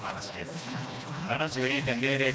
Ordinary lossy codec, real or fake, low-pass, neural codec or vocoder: none; fake; none; codec, 16 kHz, 1 kbps, FreqCodec, smaller model